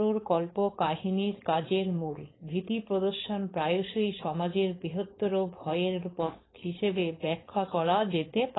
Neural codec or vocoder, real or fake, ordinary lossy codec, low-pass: codec, 16 kHz, 4.8 kbps, FACodec; fake; AAC, 16 kbps; 7.2 kHz